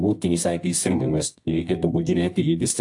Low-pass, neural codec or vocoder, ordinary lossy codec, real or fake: 10.8 kHz; codec, 24 kHz, 0.9 kbps, WavTokenizer, medium music audio release; AAC, 64 kbps; fake